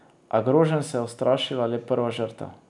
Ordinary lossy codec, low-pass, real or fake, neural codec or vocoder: none; 10.8 kHz; real; none